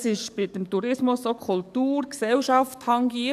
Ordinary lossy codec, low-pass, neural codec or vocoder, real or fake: none; 14.4 kHz; codec, 44.1 kHz, 7.8 kbps, DAC; fake